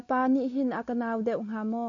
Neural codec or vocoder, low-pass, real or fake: none; 7.2 kHz; real